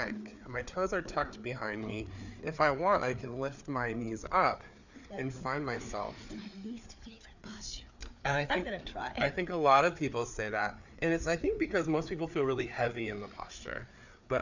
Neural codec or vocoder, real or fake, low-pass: codec, 16 kHz, 4 kbps, FreqCodec, larger model; fake; 7.2 kHz